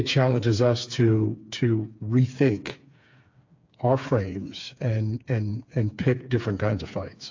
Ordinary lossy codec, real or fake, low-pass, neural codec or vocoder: AAC, 48 kbps; fake; 7.2 kHz; codec, 16 kHz, 4 kbps, FreqCodec, smaller model